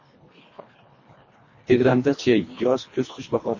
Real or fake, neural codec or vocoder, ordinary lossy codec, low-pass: fake; codec, 24 kHz, 1.5 kbps, HILCodec; MP3, 32 kbps; 7.2 kHz